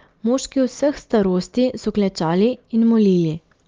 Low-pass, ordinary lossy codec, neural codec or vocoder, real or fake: 7.2 kHz; Opus, 32 kbps; none; real